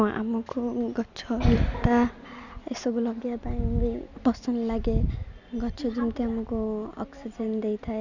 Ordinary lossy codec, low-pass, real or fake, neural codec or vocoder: none; 7.2 kHz; real; none